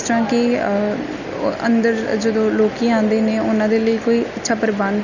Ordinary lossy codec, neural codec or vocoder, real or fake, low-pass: none; none; real; 7.2 kHz